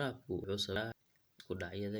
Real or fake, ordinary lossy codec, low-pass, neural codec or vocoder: real; none; none; none